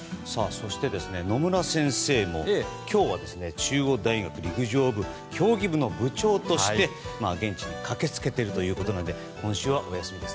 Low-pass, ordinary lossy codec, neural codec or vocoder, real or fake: none; none; none; real